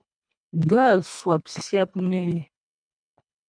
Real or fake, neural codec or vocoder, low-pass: fake; codec, 24 kHz, 1.5 kbps, HILCodec; 9.9 kHz